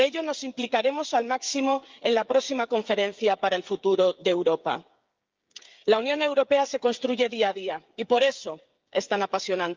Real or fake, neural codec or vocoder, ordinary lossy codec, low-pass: fake; codec, 16 kHz, 8 kbps, FreqCodec, smaller model; Opus, 24 kbps; 7.2 kHz